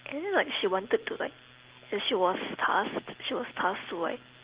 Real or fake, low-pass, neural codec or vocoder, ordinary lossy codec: real; 3.6 kHz; none; Opus, 16 kbps